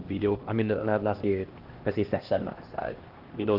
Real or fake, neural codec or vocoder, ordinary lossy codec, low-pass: fake; codec, 16 kHz, 1 kbps, X-Codec, HuBERT features, trained on LibriSpeech; Opus, 16 kbps; 5.4 kHz